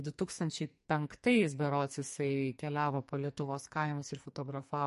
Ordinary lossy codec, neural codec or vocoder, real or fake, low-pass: MP3, 48 kbps; codec, 44.1 kHz, 2.6 kbps, SNAC; fake; 14.4 kHz